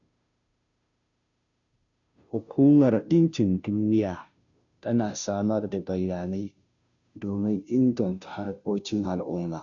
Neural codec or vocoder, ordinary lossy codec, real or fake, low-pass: codec, 16 kHz, 0.5 kbps, FunCodec, trained on Chinese and English, 25 frames a second; none; fake; 7.2 kHz